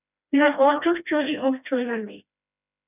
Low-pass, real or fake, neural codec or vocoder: 3.6 kHz; fake; codec, 16 kHz, 1 kbps, FreqCodec, smaller model